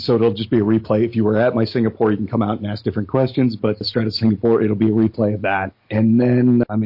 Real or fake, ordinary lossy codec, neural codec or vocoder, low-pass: real; MP3, 32 kbps; none; 5.4 kHz